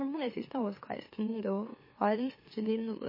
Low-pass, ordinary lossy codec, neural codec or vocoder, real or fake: 5.4 kHz; MP3, 24 kbps; autoencoder, 44.1 kHz, a latent of 192 numbers a frame, MeloTTS; fake